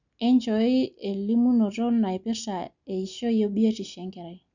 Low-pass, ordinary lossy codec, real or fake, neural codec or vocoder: 7.2 kHz; none; real; none